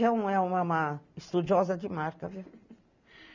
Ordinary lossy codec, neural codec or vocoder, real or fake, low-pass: none; none; real; 7.2 kHz